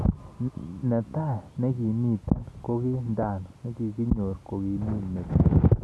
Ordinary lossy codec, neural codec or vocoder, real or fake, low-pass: none; none; real; none